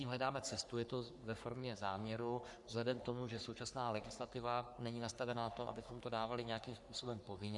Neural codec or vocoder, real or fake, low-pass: codec, 44.1 kHz, 3.4 kbps, Pupu-Codec; fake; 10.8 kHz